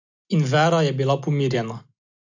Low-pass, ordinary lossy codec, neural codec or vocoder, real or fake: 7.2 kHz; AAC, 48 kbps; none; real